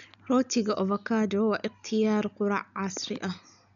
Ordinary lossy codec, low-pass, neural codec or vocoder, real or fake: none; 7.2 kHz; none; real